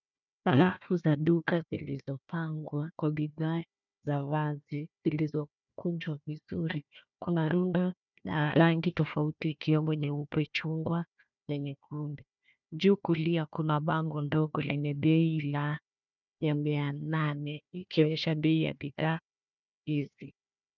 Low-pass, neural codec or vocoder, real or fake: 7.2 kHz; codec, 16 kHz, 1 kbps, FunCodec, trained on Chinese and English, 50 frames a second; fake